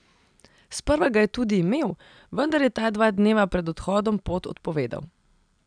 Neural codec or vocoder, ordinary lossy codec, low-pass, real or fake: none; none; 9.9 kHz; real